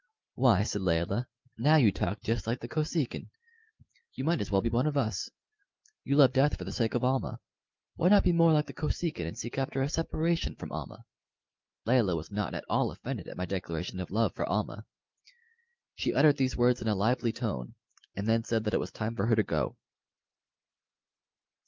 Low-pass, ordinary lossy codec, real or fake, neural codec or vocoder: 7.2 kHz; Opus, 24 kbps; real; none